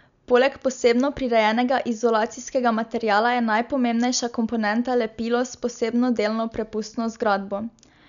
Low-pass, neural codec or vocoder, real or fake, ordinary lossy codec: 7.2 kHz; none; real; none